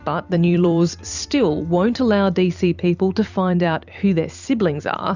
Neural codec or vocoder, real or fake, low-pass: vocoder, 44.1 kHz, 128 mel bands every 256 samples, BigVGAN v2; fake; 7.2 kHz